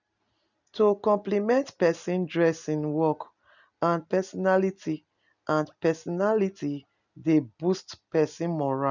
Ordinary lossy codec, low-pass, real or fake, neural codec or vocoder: none; 7.2 kHz; real; none